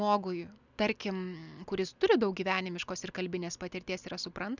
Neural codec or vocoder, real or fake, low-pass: none; real; 7.2 kHz